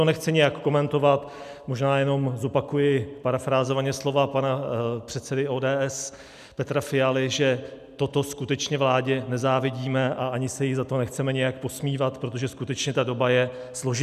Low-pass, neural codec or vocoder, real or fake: 14.4 kHz; none; real